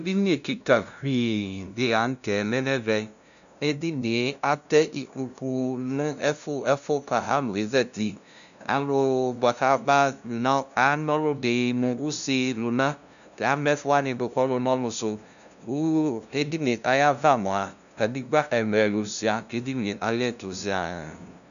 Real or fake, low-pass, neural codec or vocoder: fake; 7.2 kHz; codec, 16 kHz, 0.5 kbps, FunCodec, trained on LibriTTS, 25 frames a second